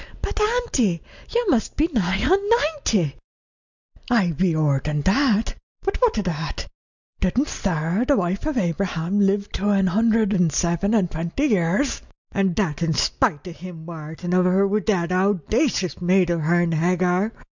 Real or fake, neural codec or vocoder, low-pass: real; none; 7.2 kHz